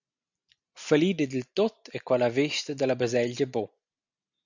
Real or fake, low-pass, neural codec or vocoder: real; 7.2 kHz; none